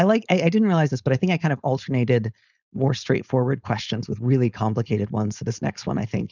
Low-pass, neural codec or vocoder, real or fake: 7.2 kHz; codec, 16 kHz, 4.8 kbps, FACodec; fake